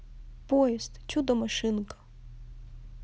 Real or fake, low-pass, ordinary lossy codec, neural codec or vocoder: real; none; none; none